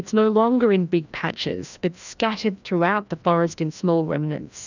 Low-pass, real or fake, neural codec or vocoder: 7.2 kHz; fake; codec, 16 kHz, 1 kbps, FreqCodec, larger model